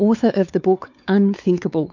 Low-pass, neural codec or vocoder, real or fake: 7.2 kHz; codec, 16 kHz, 4 kbps, X-Codec, WavLM features, trained on Multilingual LibriSpeech; fake